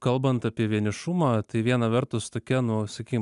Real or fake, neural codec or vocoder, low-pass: real; none; 10.8 kHz